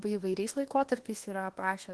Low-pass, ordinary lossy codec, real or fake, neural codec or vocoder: 10.8 kHz; Opus, 16 kbps; fake; codec, 24 kHz, 1.2 kbps, DualCodec